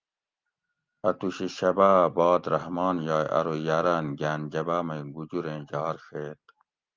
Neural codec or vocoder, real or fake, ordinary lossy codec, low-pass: none; real; Opus, 32 kbps; 7.2 kHz